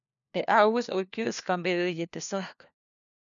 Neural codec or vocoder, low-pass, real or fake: codec, 16 kHz, 1 kbps, FunCodec, trained on LibriTTS, 50 frames a second; 7.2 kHz; fake